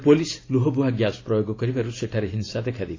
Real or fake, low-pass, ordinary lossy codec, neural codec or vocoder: real; 7.2 kHz; AAC, 32 kbps; none